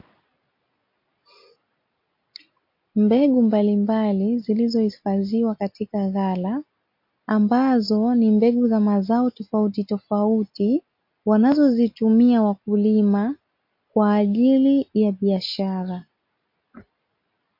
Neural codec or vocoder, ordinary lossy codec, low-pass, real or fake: none; MP3, 32 kbps; 5.4 kHz; real